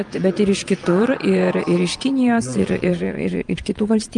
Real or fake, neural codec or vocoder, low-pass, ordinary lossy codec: fake; vocoder, 22.05 kHz, 80 mel bands, Vocos; 9.9 kHz; Opus, 32 kbps